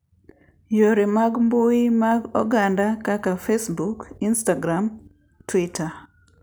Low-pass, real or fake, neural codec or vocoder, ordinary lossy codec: none; real; none; none